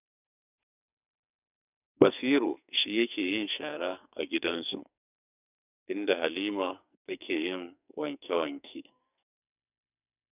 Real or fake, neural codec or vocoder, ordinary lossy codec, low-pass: fake; codec, 44.1 kHz, 2.6 kbps, SNAC; none; 3.6 kHz